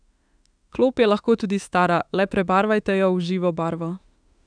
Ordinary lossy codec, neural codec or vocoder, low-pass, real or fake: none; autoencoder, 48 kHz, 32 numbers a frame, DAC-VAE, trained on Japanese speech; 9.9 kHz; fake